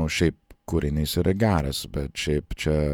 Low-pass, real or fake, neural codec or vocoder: 19.8 kHz; fake; vocoder, 48 kHz, 128 mel bands, Vocos